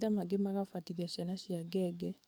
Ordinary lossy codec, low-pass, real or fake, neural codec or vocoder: none; none; fake; codec, 44.1 kHz, 7.8 kbps, DAC